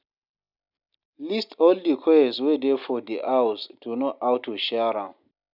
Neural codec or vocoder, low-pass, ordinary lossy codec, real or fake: none; 5.4 kHz; none; real